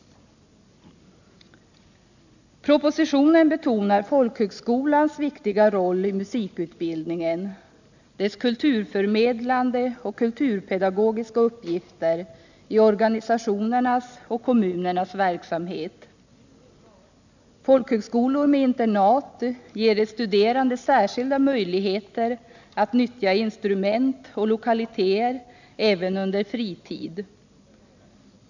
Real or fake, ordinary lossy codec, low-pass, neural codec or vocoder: real; none; 7.2 kHz; none